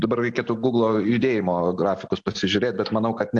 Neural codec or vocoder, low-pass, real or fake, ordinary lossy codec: none; 9.9 kHz; real; AAC, 64 kbps